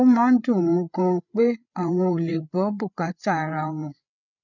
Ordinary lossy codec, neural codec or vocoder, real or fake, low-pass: none; codec, 16 kHz, 8 kbps, FreqCodec, larger model; fake; 7.2 kHz